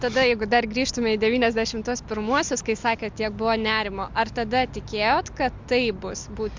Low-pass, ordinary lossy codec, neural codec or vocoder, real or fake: 7.2 kHz; MP3, 64 kbps; none; real